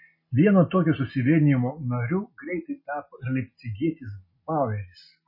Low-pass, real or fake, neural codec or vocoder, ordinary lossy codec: 5.4 kHz; real; none; MP3, 24 kbps